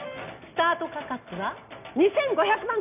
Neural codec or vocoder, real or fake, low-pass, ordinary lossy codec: none; real; 3.6 kHz; none